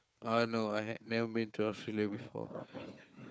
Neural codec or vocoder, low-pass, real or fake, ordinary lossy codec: codec, 16 kHz, 4 kbps, FunCodec, trained on Chinese and English, 50 frames a second; none; fake; none